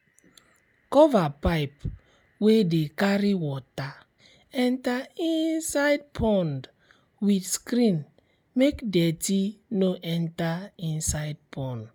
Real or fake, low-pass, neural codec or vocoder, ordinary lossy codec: real; none; none; none